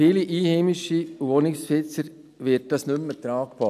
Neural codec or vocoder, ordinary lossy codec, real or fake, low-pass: none; none; real; 14.4 kHz